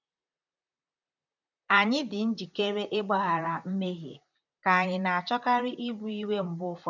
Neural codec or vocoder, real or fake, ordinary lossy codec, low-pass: vocoder, 44.1 kHz, 128 mel bands, Pupu-Vocoder; fake; none; 7.2 kHz